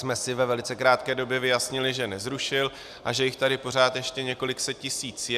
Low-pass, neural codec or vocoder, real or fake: 14.4 kHz; none; real